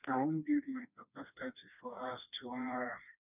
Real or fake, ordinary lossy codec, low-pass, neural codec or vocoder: fake; none; 3.6 kHz; codec, 16 kHz, 2 kbps, FreqCodec, smaller model